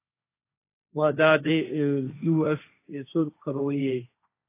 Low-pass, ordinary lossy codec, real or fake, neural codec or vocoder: 3.6 kHz; AAC, 24 kbps; fake; codec, 16 kHz, 1.1 kbps, Voila-Tokenizer